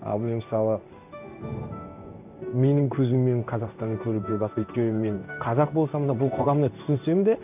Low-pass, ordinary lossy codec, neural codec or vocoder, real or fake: 3.6 kHz; none; codec, 16 kHz in and 24 kHz out, 1 kbps, XY-Tokenizer; fake